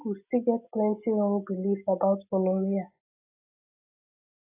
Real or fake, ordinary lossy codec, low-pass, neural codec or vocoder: real; AAC, 24 kbps; 3.6 kHz; none